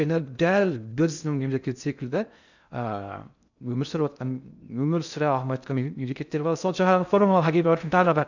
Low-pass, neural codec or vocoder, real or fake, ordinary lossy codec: 7.2 kHz; codec, 16 kHz in and 24 kHz out, 0.6 kbps, FocalCodec, streaming, 2048 codes; fake; none